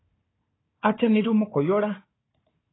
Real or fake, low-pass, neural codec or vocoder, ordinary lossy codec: fake; 7.2 kHz; codec, 16 kHz, 4 kbps, FunCodec, trained on Chinese and English, 50 frames a second; AAC, 16 kbps